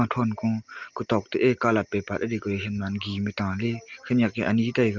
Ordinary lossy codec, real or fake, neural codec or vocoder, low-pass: Opus, 24 kbps; real; none; 7.2 kHz